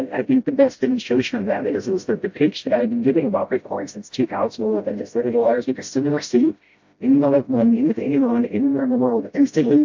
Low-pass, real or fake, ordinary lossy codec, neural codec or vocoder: 7.2 kHz; fake; MP3, 48 kbps; codec, 16 kHz, 0.5 kbps, FreqCodec, smaller model